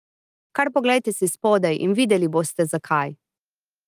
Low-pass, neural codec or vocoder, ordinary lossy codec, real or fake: 14.4 kHz; none; Opus, 32 kbps; real